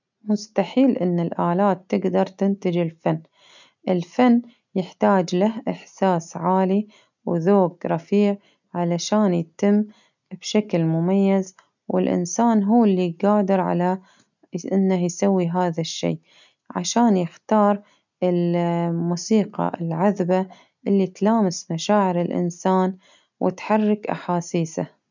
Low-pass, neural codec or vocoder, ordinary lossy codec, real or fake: 7.2 kHz; none; none; real